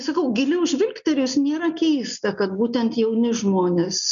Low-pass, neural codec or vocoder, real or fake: 7.2 kHz; none; real